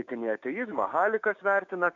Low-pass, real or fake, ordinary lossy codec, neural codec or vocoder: 7.2 kHz; fake; MP3, 64 kbps; codec, 16 kHz, 6 kbps, DAC